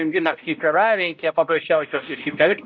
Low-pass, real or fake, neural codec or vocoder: 7.2 kHz; fake; codec, 16 kHz, 0.5 kbps, X-Codec, HuBERT features, trained on balanced general audio